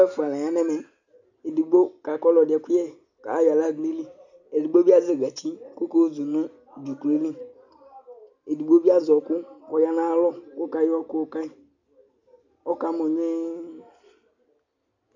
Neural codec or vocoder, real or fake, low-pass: none; real; 7.2 kHz